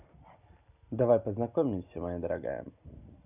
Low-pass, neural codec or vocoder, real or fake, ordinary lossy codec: 3.6 kHz; none; real; none